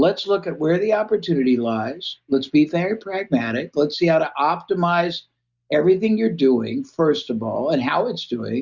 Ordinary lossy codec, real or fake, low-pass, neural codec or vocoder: Opus, 64 kbps; real; 7.2 kHz; none